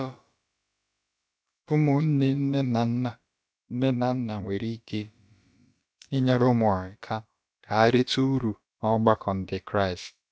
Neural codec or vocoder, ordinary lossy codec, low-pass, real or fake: codec, 16 kHz, about 1 kbps, DyCAST, with the encoder's durations; none; none; fake